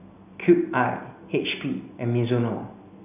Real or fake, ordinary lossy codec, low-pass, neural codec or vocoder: real; none; 3.6 kHz; none